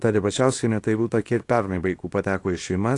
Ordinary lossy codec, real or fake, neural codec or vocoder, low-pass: AAC, 48 kbps; fake; codec, 24 kHz, 0.9 kbps, WavTokenizer, small release; 10.8 kHz